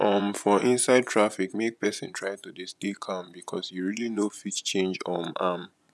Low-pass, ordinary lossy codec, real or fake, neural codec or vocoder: none; none; fake; vocoder, 24 kHz, 100 mel bands, Vocos